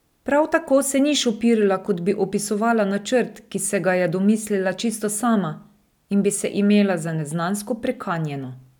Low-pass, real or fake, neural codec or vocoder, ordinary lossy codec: 19.8 kHz; real; none; none